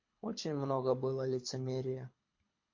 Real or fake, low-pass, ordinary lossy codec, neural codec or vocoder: fake; 7.2 kHz; MP3, 48 kbps; codec, 24 kHz, 6 kbps, HILCodec